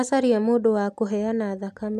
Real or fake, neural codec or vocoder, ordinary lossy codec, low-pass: real; none; none; 14.4 kHz